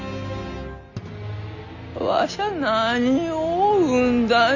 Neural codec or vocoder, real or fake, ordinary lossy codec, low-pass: none; real; none; 7.2 kHz